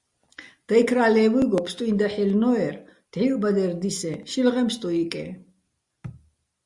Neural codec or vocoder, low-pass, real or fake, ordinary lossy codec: none; 10.8 kHz; real; Opus, 64 kbps